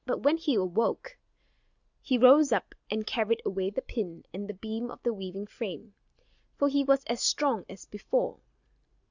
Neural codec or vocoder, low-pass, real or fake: none; 7.2 kHz; real